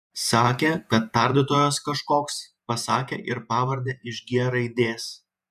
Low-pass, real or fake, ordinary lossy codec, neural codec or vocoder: 14.4 kHz; fake; MP3, 96 kbps; vocoder, 48 kHz, 128 mel bands, Vocos